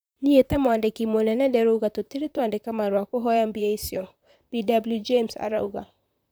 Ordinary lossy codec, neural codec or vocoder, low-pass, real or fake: none; vocoder, 44.1 kHz, 128 mel bands, Pupu-Vocoder; none; fake